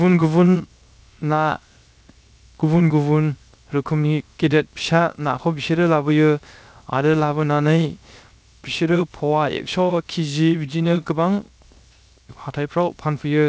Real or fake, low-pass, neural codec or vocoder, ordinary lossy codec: fake; none; codec, 16 kHz, 0.7 kbps, FocalCodec; none